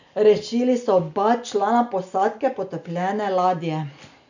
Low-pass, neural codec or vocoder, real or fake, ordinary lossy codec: 7.2 kHz; none; real; none